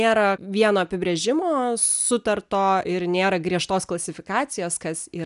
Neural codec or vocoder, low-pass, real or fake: none; 10.8 kHz; real